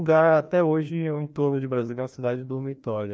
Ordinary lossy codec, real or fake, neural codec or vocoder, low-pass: none; fake; codec, 16 kHz, 1 kbps, FreqCodec, larger model; none